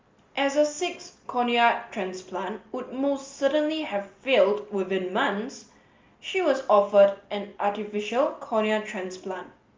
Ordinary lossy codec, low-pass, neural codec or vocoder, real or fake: Opus, 32 kbps; 7.2 kHz; none; real